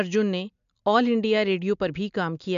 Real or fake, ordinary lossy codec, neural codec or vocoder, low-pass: real; MP3, 64 kbps; none; 7.2 kHz